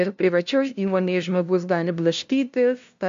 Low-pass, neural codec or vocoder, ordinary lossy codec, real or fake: 7.2 kHz; codec, 16 kHz, 0.5 kbps, FunCodec, trained on LibriTTS, 25 frames a second; AAC, 64 kbps; fake